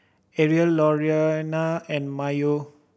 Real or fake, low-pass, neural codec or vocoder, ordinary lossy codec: real; none; none; none